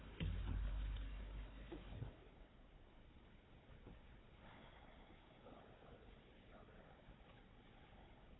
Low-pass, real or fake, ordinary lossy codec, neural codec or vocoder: 7.2 kHz; fake; AAC, 16 kbps; codec, 16 kHz, 4 kbps, FunCodec, trained on Chinese and English, 50 frames a second